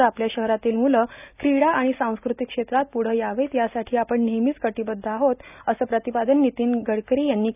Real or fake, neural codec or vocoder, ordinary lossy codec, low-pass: real; none; none; 3.6 kHz